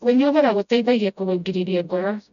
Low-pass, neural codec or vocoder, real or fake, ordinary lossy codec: 7.2 kHz; codec, 16 kHz, 0.5 kbps, FreqCodec, smaller model; fake; none